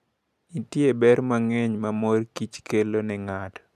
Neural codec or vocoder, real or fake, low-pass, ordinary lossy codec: none; real; 14.4 kHz; none